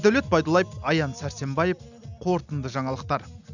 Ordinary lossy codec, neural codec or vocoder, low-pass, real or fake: none; none; 7.2 kHz; real